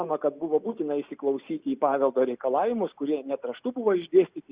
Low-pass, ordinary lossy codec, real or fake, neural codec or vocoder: 3.6 kHz; Opus, 64 kbps; real; none